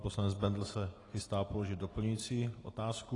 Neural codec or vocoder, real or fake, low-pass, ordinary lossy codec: none; real; 10.8 kHz; AAC, 32 kbps